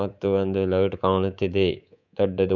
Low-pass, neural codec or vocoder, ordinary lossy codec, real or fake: 7.2 kHz; codec, 24 kHz, 3.1 kbps, DualCodec; none; fake